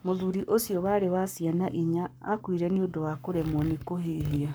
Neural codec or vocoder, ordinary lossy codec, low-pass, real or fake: codec, 44.1 kHz, 7.8 kbps, Pupu-Codec; none; none; fake